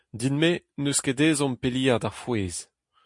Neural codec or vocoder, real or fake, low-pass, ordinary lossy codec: none; real; 10.8 kHz; MP3, 48 kbps